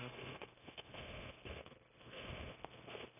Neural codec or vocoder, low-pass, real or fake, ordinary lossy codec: codec, 16 kHz, 0.9 kbps, LongCat-Audio-Codec; 3.6 kHz; fake; none